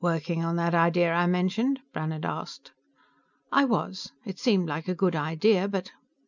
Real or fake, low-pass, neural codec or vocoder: real; 7.2 kHz; none